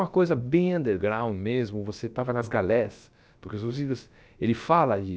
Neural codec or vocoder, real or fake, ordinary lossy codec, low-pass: codec, 16 kHz, about 1 kbps, DyCAST, with the encoder's durations; fake; none; none